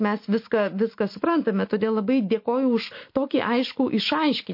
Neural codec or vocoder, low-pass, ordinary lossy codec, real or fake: none; 5.4 kHz; MP3, 32 kbps; real